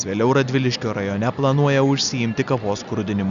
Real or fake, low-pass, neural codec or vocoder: real; 7.2 kHz; none